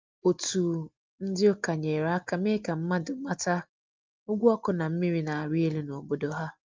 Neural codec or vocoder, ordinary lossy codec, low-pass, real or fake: none; Opus, 24 kbps; 7.2 kHz; real